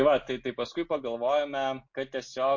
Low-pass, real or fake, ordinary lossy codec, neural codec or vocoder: 7.2 kHz; real; MP3, 48 kbps; none